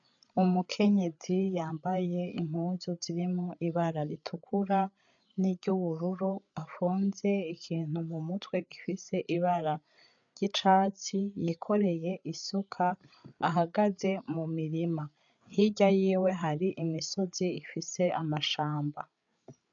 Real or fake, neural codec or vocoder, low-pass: fake; codec, 16 kHz, 8 kbps, FreqCodec, larger model; 7.2 kHz